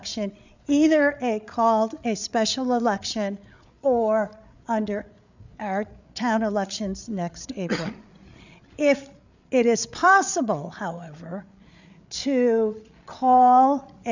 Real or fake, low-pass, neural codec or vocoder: fake; 7.2 kHz; codec, 16 kHz, 8 kbps, FreqCodec, larger model